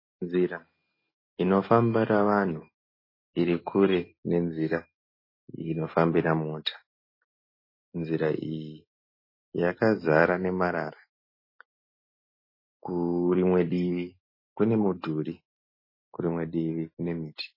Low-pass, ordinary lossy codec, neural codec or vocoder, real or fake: 5.4 kHz; MP3, 24 kbps; none; real